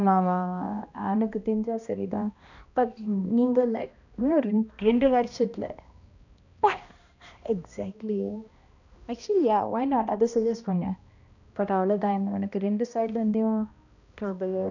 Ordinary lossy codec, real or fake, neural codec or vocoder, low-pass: none; fake; codec, 16 kHz, 1 kbps, X-Codec, HuBERT features, trained on balanced general audio; 7.2 kHz